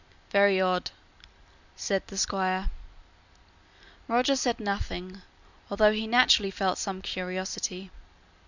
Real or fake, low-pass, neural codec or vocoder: real; 7.2 kHz; none